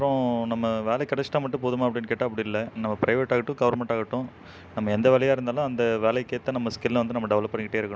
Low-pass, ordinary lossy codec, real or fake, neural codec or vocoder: none; none; real; none